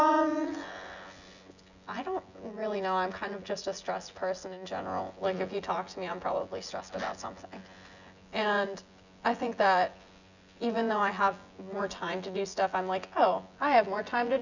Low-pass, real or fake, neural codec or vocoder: 7.2 kHz; fake; vocoder, 24 kHz, 100 mel bands, Vocos